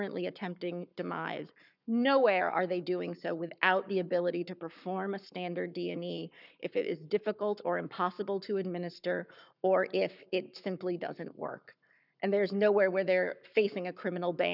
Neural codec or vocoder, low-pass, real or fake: codec, 44.1 kHz, 7.8 kbps, Pupu-Codec; 5.4 kHz; fake